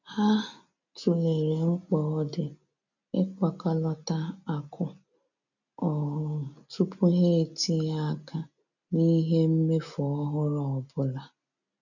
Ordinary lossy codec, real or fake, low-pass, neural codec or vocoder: none; real; 7.2 kHz; none